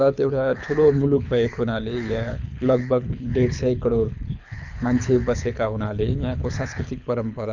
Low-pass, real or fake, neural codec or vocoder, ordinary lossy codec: 7.2 kHz; fake; codec, 24 kHz, 6 kbps, HILCodec; none